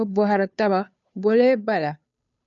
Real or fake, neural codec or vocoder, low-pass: fake; codec, 16 kHz, 2 kbps, FunCodec, trained on Chinese and English, 25 frames a second; 7.2 kHz